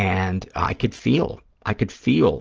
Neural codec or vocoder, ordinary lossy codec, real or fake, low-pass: none; Opus, 16 kbps; real; 7.2 kHz